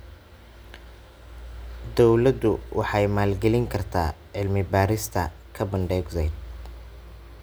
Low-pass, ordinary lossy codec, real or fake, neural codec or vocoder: none; none; real; none